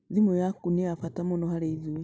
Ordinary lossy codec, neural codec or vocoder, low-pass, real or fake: none; none; none; real